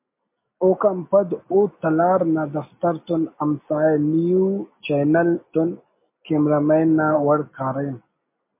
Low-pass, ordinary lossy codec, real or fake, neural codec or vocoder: 3.6 kHz; MP3, 24 kbps; real; none